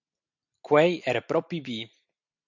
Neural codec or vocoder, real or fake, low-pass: none; real; 7.2 kHz